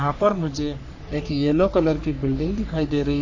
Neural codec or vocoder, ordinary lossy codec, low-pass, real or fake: codec, 44.1 kHz, 3.4 kbps, Pupu-Codec; AAC, 48 kbps; 7.2 kHz; fake